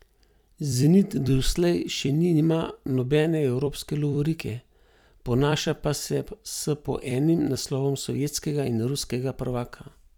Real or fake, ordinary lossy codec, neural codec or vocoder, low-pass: fake; none; vocoder, 44.1 kHz, 128 mel bands every 256 samples, BigVGAN v2; 19.8 kHz